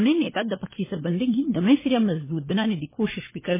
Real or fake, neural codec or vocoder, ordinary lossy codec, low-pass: fake; codec, 16 kHz, 4 kbps, FunCodec, trained on LibriTTS, 50 frames a second; MP3, 16 kbps; 3.6 kHz